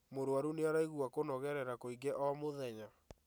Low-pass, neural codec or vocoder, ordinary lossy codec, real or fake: none; none; none; real